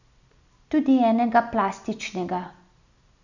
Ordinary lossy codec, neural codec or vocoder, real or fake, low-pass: none; none; real; 7.2 kHz